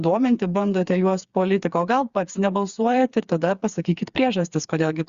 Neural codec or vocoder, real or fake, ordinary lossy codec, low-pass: codec, 16 kHz, 4 kbps, FreqCodec, smaller model; fake; Opus, 64 kbps; 7.2 kHz